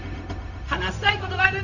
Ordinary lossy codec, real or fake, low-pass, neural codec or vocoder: none; fake; 7.2 kHz; codec, 16 kHz, 0.4 kbps, LongCat-Audio-Codec